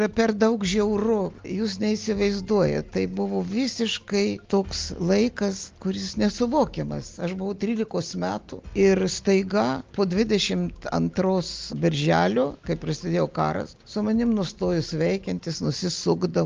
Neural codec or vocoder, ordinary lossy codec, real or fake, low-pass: none; Opus, 24 kbps; real; 7.2 kHz